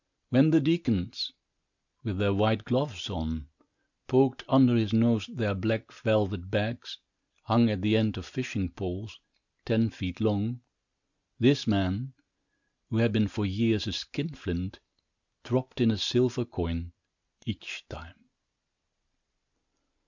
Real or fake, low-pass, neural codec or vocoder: real; 7.2 kHz; none